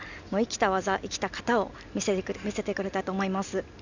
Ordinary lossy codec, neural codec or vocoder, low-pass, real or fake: none; none; 7.2 kHz; real